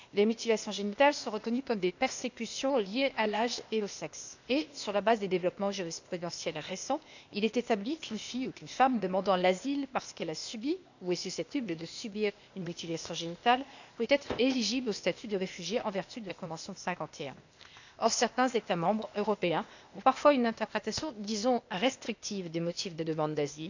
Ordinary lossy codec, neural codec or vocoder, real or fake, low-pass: none; codec, 16 kHz, 0.8 kbps, ZipCodec; fake; 7.2 kHz